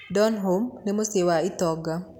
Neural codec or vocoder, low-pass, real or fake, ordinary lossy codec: none; 19.8 kHz; real; none